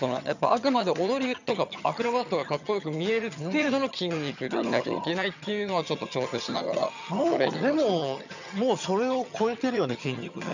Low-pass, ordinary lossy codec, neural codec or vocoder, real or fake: 7.2 kHz; none; vocoder, 22.05 kHz, 80 mel bands, HiFi-GAN; fake